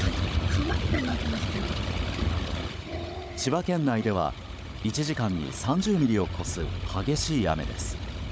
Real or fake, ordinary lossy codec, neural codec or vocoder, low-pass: fake; none; codec, 16 kHz, 16 kbps, FunCodec, trained on Chinese and English, 50 frames a second; none